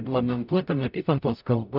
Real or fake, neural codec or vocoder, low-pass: fake; codec, 44.1 kHz, 0.9 kbps, DAC; 5.4 kHz